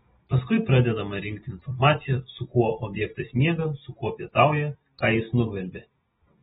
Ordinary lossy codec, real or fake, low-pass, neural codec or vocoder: AAC, 16 kbps; real; 19.8 kHz; none